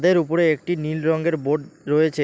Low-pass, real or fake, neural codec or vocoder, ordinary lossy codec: none; real; none; none